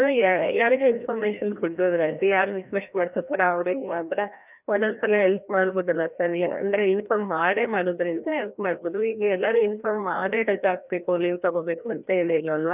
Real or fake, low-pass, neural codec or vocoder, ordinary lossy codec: fake; 3.6 kHz; codec, 16 kHz, 1 kbps, FreqCodec, larger model; none